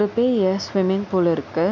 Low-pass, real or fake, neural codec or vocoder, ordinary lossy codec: 7.2 kHz; real; none; none